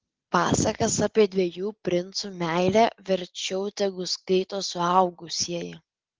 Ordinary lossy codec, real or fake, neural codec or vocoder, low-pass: Opus, 16 kbps; real; none; 7.2 kHz